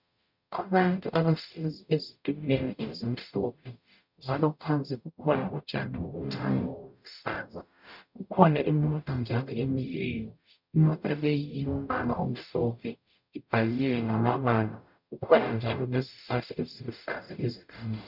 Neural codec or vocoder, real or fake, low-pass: codec, 44.1 kHz, 0.9 kbps, DAC; fake; 5.4 kHz